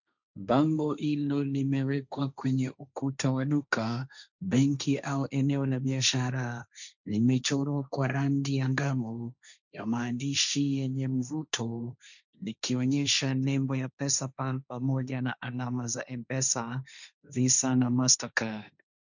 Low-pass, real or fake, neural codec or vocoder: 7.2 kHz; fake; codec, 16 kHz, 1.1 kbps, Voila-Tokenizer